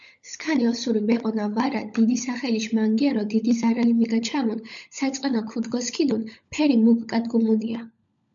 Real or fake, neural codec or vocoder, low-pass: fake; codec, 16 kHz, 16 kbps, FunCodec, trained on LibriTTS, 50 frames a second; 7.2 kHz